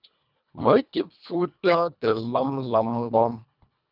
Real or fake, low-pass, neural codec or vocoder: fake; 5.4 kHz; codec, 24 kHz, 1.5 kbps, HILCodec